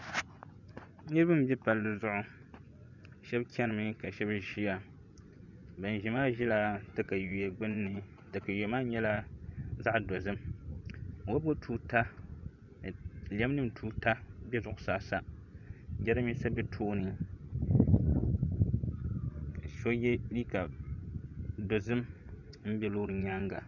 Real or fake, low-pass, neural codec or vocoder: fake; 7.2 kHz; vocoder, 24 kHz, 100 mel bands, Vocos